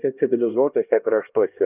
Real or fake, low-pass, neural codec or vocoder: fake; 3.6 kHz; codec, 16 kHz, 1 kbps, X-Codec, HuBERT features, trained on LibriSpeech